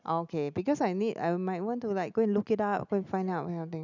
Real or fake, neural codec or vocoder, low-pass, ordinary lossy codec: real; none; 7.2 kHz; none